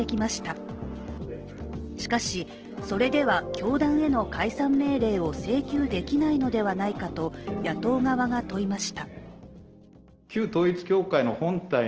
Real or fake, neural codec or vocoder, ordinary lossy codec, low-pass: real; none; Opus, 16 kbps; 7.2 kHz